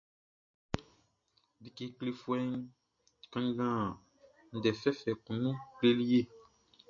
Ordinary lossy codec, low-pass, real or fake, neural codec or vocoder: MP3, 48 kbps; 7.2 kHz; real; none